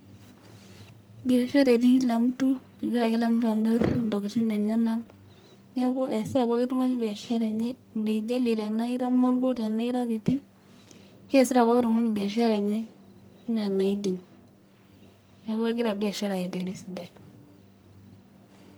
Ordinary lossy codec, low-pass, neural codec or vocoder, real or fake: none; none; codec, 44.1 kHz, 1.7 kbps, Pupu-Codec; fake